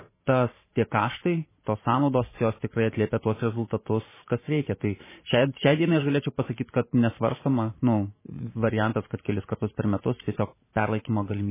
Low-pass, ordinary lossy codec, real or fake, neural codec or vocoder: 3.6 kHz; MP3, 16 kbps; fake; vocoder, 44.1 kHz, 128 mel bands every 512 samples, BigVGAN v2